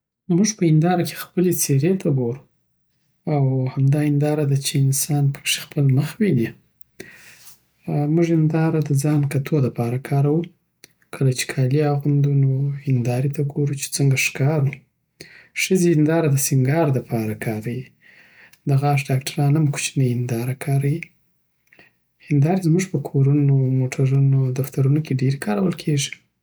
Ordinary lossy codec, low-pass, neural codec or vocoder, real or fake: none; none; none; real